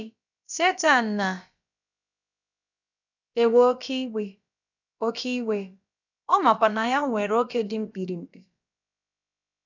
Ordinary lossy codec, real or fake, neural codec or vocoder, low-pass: none; fake; codec, 16 kHz, about 1 kbps, DyCAST, with the encoder's durations; 7.2 kHz